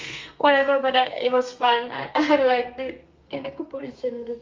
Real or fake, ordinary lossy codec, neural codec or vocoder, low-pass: fake; Opus, 32 kbps; codec, 32 kHz, 1.9 kbps, SNAC; 7.2 kHz